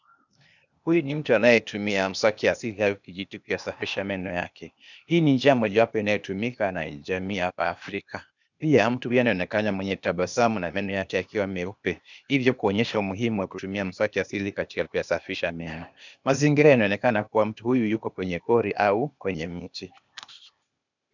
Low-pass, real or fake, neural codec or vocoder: 7.2 kHz; fake; codec, 16 kHz, 0.8 kbps, ZipCodec